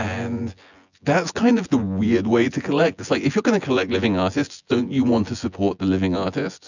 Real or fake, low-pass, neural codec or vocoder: fake; 7.2 kHz; vocoder, 24 kHz, 100 mel bands, Vocos